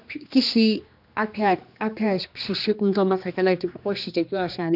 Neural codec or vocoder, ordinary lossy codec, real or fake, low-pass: codec, 16 kHz, 2 kbps, X-Codec, HuBERT features, trained on general audio; none; fake; 5.4 kHz